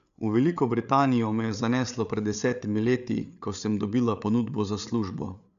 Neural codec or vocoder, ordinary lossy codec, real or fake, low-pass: codec, 16 kHz, 8 kbps, FreqCodec, larger model; none; fake; 7.2 kHz